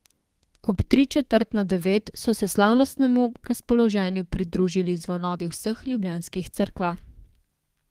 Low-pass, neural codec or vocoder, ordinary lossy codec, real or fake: 14.4 kHz; codec, 32 kHz, 1.9 kbps, SNAC; Opus, 24 kbps; fake